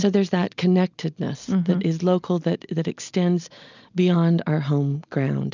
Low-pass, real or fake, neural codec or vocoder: 7.2 kHz; real; none